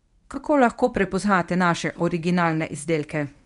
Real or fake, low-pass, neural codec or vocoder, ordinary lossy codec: fake; 10.8 kHz; codec, 24 kHz, 0.9 kbps, WavTokenizer, medium speech release version 1; none